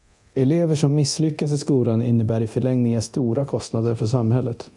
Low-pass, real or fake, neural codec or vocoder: 10.8 kHz; fake; codec, 24 kHz, 0.9 kbps, DualCodec